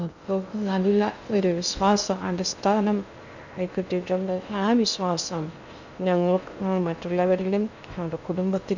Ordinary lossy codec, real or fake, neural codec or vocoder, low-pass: none; fake; codec, 16 kHz in and 24 kHz out, 0.6 kbps, FocalCodec, streaming, 2048 codes; 7.2 kHz